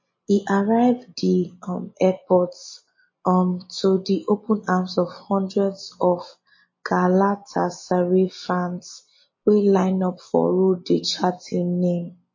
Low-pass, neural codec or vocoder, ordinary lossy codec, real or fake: 7.2 kHz; none; MP3, 32 kbps; real